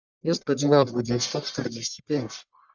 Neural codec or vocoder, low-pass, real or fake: codec, 44.1 kHz, 1.7 kbps, Pupu-Codec; 7.2 kHz; fake